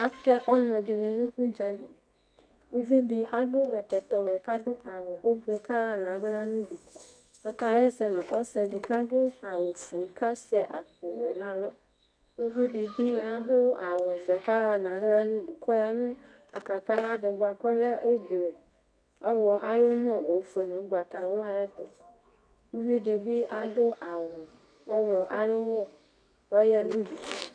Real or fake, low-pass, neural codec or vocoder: fake; 9.9 kHz; codec, 24 kHz, 0.9 kbps, WavTokenizer, medium music audio release